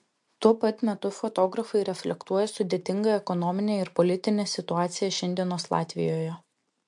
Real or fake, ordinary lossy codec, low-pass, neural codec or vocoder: real; MP3, 64 kbps; 10.8 kHz; none